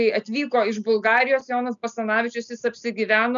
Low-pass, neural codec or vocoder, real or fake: 7.2 kHz; none; real